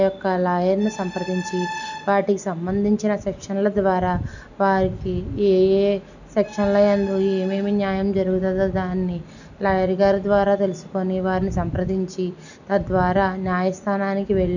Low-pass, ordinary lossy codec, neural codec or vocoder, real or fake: 7.2 kHz; none; none; real